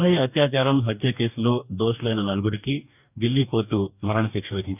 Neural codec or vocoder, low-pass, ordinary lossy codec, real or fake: codec, 44.1 kHz, 2.6 kbps, DAC; 3.6 kHz; none; fake